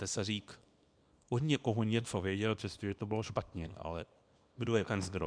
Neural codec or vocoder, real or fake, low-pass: codec, 24 kHz, 0.9 kbps, WavTokenizer, small release; fake; 9.9 kHz